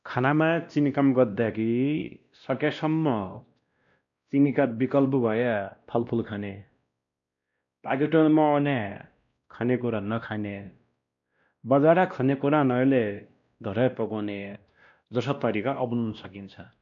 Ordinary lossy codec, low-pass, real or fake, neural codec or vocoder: none; 7.2 kHz; fake; codec, 16 kHz, 1 kbps, X-Codec, WavLM features, trained on Multilingual LibriSpeech